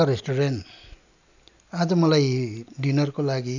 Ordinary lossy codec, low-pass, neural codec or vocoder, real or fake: none; 7.2 kHz; none; real